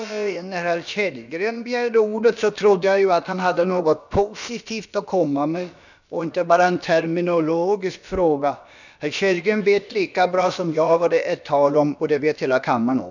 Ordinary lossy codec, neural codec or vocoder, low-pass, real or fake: none; codec, 16 kHz, about 1 kbps, DyCAST, with the encoder's durations; 7.2 kHz; fake